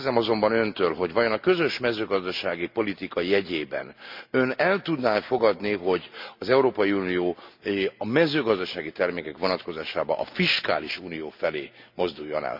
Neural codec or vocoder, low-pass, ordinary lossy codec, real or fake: none; 5.4 kHz; MP3, 48 kbps; real